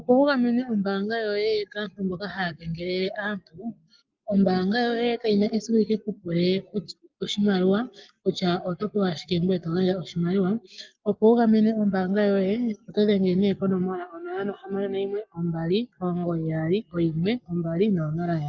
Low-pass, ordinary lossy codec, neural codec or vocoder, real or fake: 7.2 kHz; Opus, 24 kbps; codec, 44.1 kHz, 7.8 kbps, Pupu-Codec; fake